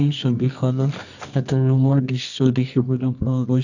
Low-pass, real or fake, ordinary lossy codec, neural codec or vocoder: 7.2 kHz; fake; none; codec, 24 kHz, 0.9 kbps, WavTokenizer, medium music audio release